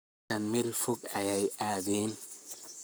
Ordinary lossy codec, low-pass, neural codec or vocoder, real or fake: none; none; codec, 44.1 kHz, 7.8 kbps, Pupu-Codec; fake